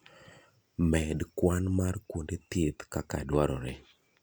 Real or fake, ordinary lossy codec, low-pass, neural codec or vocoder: real; none; none; none